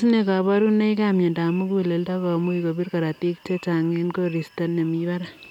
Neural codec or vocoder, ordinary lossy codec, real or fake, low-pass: none; none; real; 19.8 kHz